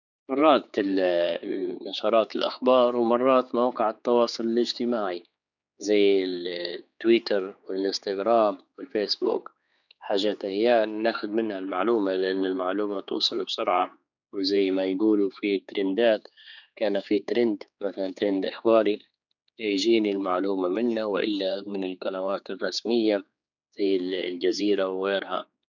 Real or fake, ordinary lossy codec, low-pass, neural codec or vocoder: fake; none; 7.2 kHz; codec, 16 kHz, 4 kbps, X-Codec, HuBERT features, trained on general audio